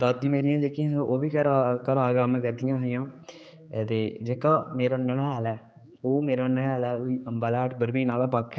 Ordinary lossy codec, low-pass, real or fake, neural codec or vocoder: none; none; fake; codec, 16 kHz, 4 kbps, X-Codec, HuBERT features, trained on general audio